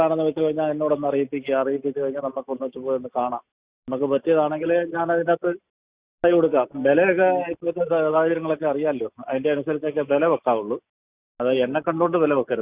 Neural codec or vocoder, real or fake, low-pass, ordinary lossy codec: none; real; 3.6 kHz; Opus, 64 kbps